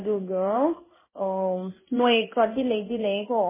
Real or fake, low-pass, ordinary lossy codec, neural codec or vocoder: fake; 3.6 kHz; MP3, 16 kbps; codec, 16 kHz in and 24 kHz out, 1 kbps, XY-Tokenizer